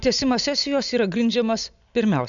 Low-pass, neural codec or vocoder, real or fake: 7.2 kHz; none; real